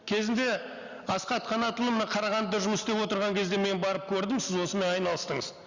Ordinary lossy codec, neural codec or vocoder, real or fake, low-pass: Opus, 64 kbps; none; real; 7.2 kHz